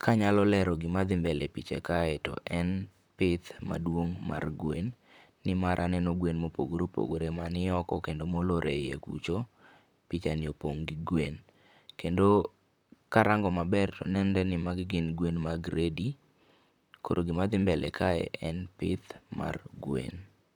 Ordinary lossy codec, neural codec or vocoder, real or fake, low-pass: none; vocoder, 44.1 kHz, 128 mel bands, Pupu-Vocoder; fake; 19.8 kHz